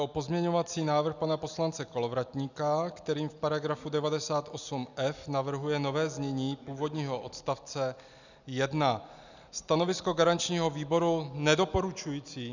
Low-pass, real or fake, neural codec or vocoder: 7.2 kHz; real; none